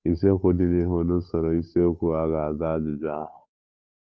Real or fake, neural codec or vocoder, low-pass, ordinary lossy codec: fake; codec, 16 kHz, 8 kbps, FunCodec, trained on Chinese and English, 25 frames a second; none; none